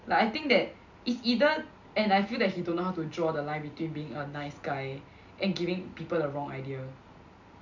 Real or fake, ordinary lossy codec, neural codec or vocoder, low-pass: real; none; none; 7.2 kHz